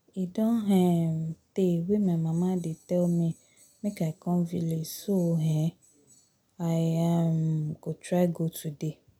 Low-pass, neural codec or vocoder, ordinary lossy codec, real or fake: 19.8 kHz; none; none; real